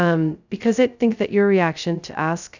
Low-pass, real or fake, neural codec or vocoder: 7.2 kHz; fake; codec, 16 kHz, 0.2 kbps, FocalCodec